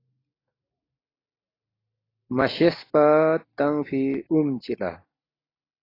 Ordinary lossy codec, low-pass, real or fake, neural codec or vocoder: AAC, 24 kbps; 5.4 kHz; real; none